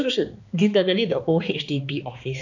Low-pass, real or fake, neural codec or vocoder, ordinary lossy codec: 7.2 kHz; fake; codec, 16 kHz, 2 kbps, X-Codec, HuBERT features, trained on balanced general audio; none